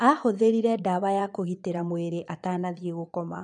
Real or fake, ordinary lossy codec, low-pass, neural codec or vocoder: fake; none; 9.9 kHz; vocoder, 22.05 kHz, 80 mel bands, Vocos